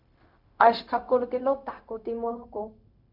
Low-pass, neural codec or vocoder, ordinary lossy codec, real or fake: 5.4 kHz; codec, 16 kHz, 0.4 kbps, LongCat-Audio-Codec; MP3, 48 kbps; fake